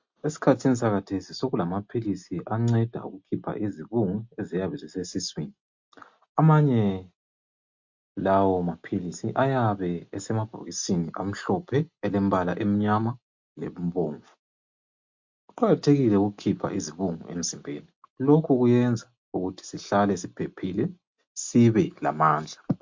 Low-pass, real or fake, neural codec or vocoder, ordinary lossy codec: 7.2 kHz; real; none; MP3, 48 kbps